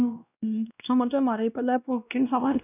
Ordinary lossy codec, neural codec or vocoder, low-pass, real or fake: none; codec, 16 kHz, 1 kbps, X-Codec, WavLM features, trained on Multilingual LibriSpeech; 3.6 kHz; fake